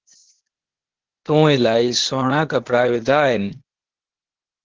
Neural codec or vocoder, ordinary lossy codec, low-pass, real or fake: codec, 16 kHz, 0.8 kbps, ZipCodec; Opus, 16 kbps; 7.2 kHz; fake